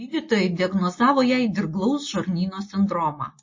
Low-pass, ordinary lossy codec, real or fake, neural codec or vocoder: 7.2 kHz; MP3, 32 kbps; real; none